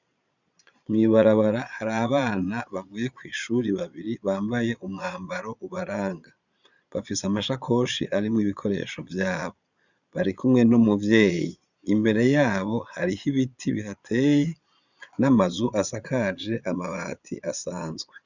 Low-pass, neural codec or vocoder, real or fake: 7.2 kHz; vocoder, 22.05 kHz, 80 mel bands, Vocos; fake